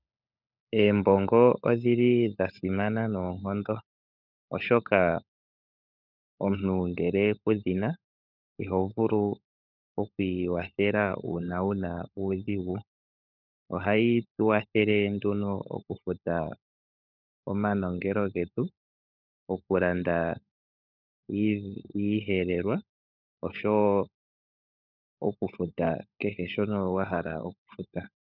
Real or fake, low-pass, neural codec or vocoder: fake; 5.4 kHz; codec, 16 kHz, 16 kbps, FunCodec, trained on LibriTTS, 50 frames a second